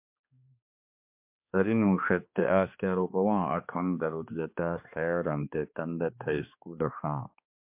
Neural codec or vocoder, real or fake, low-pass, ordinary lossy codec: codec, 16 kHz, 2 kbps, X-Codec, HuBERT features, trained on balanced general audio; fake; 3.6 kHz; MP3, 32 kbps